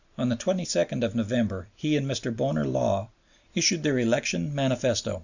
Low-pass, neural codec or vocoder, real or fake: 7.2 kHz; none; real